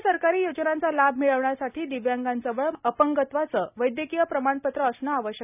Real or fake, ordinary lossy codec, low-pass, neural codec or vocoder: real; none; 3.6 kHz; none